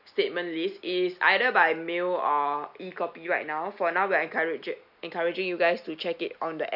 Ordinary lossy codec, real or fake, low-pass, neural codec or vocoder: none; real; 5.4 kHz; none